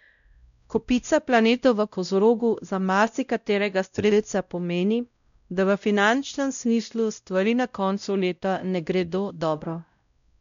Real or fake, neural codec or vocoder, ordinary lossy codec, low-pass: fake; codec, 16 kHz, 0.5 kbps, X-Codec, WavLM features, trained on Multilingual LibriSpeech; none; 7.2 kHz